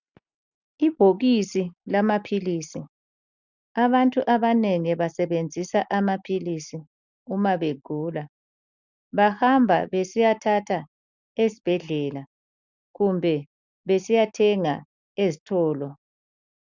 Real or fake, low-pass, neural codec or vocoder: real; 7.2 kHz; none